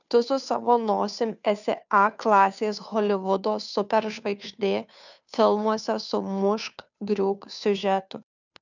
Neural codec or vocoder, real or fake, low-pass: codec, 16 kHz, 2 kbps, FunCodec, trained on Chinese and English, 25 frames a second; fake; 7.2 kHz